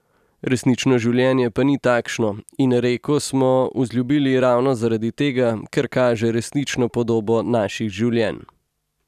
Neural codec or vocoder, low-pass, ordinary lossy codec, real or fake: none; 14.4 kHz; none; real